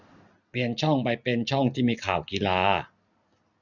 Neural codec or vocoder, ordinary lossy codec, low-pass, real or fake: none; none; 7.2 kHz; real